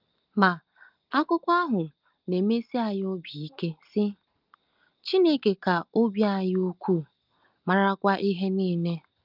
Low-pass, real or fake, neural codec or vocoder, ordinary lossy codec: 5.4 kHz; real; none; Opus, 24 kbps